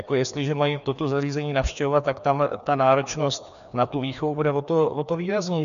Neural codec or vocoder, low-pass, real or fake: codec, 16 kHz, 2 kbps, FreqCodec, larger model; 7.2 kHz; fake